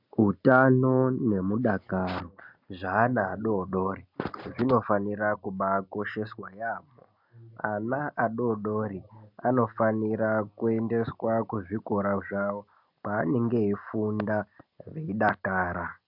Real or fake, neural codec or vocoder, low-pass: real; none; 5.4 kHz